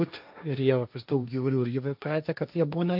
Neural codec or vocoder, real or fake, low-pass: codec, 16 kHz, 1.1 kbps, Voila-Tokenizer; fake; 5.4 kHz